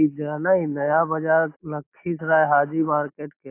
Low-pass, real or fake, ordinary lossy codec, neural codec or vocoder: 3.6 kHz; real; AAC, 24 kbps; none